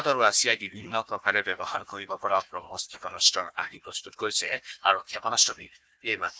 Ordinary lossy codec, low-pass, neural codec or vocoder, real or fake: none; none; codec, 16 kHz, 1 kbps, FunCodec, trained on Chinese and English, 50 frames a second; fake